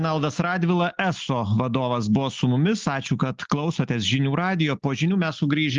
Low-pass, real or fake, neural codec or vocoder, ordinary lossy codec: 7.2 kHz; real; none; Opus, 32 kbps